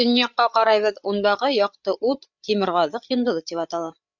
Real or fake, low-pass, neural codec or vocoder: fake; 7.2 kHz; codec, 16 kHz, 8 kbps, FreqCodec, larger model